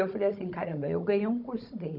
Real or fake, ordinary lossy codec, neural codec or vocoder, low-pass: fake; none; codec, 16 kHz, 16 kbps, FunCodec, trained on Chinese and English, 50 frames a second; 5.4 kHz